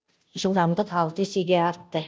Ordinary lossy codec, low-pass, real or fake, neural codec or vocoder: none; none; fake; codec, 16 kHz, 0.5 kbps, FunCodec, trained on Chinese and English, 25 frames a second